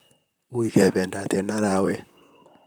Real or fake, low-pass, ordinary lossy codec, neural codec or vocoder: fake; none; none; vocoder, 44.1 kHz, 128 mel bands, Pupu-Vocoder